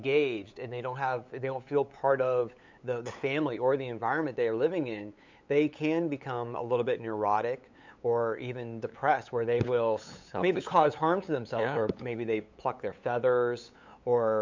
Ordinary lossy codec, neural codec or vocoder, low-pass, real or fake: MP3, 64 kbps; codec, 16 kHz, 8 kbps, FunCodec, trained on LibriTTS, 25 frames a second; 7.2 kHz; fake